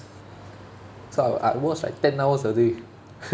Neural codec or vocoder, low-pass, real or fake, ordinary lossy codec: none; none; real; none